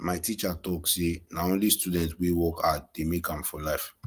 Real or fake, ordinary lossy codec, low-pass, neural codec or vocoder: real; Opus, 32 kbps; 14.4 kHz; none